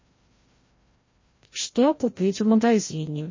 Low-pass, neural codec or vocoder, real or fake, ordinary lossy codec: 7.2 kHz; codec, 16 kHz, 0.5 kbps, FreqCodec, larger model; fake; MP3, 32 kbps